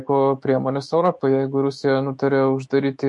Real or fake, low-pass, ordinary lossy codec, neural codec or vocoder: fake; 10.8 kHz; MP3, 48 kbps; autoencoder, 48 kHz, 128 numbers a frame, DAC-VAE, trained on Japanese speech